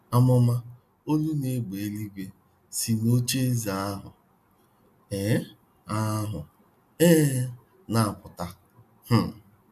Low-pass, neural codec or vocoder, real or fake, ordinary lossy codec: 14.4 kHz; none; real; none